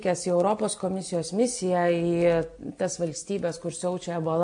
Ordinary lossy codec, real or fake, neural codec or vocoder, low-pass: MP3, 64 kbps; real; none; 9.9 kHz